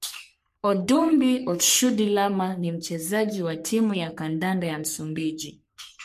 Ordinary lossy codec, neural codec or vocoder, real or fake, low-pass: MP3, 64 kbps; codec, 44.1 kHz, 3.4 kbps, Pupu-Codec; fake; 14.4 kHz